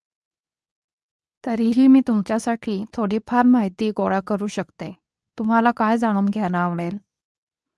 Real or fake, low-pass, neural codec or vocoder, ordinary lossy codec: fake; none; codec, 24 kHz, 0.9 kbps, WavTokenizer, medium speech release version 2; none